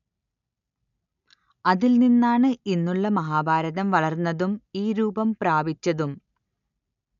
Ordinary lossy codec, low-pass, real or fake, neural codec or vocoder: none; 7.2 kHz; real; none